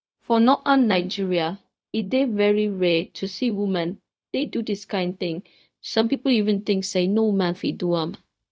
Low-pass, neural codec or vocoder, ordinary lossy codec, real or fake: none; codec, 16 kHz, 0.4 kbps, LongCat-Audio-Codec; none; fake